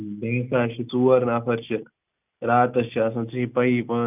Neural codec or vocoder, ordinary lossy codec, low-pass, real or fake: none; none; 3.6 kHz; real